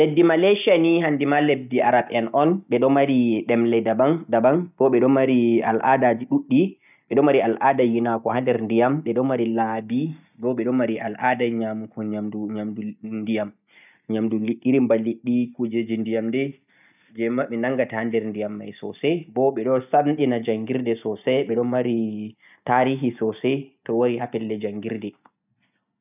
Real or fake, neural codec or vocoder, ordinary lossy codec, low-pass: real; none; none; 3.6 kHz